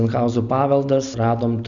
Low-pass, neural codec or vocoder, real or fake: 7.2 kHz; none; real